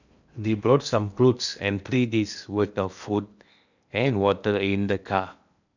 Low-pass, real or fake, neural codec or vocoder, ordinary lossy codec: 7.2 kHz; fake; codec, 16 kHz in and 24 kHz out, 0.8 kbps, FocalCodec, streaming, 65536 codes; none